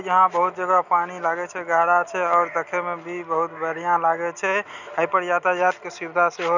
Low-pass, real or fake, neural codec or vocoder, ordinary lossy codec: 7.2 kHz; real; none; none